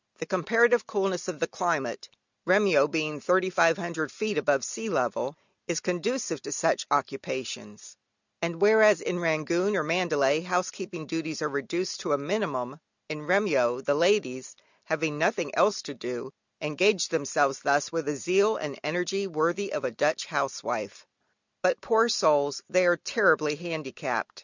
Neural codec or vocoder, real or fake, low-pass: none; real; 7.2 kHz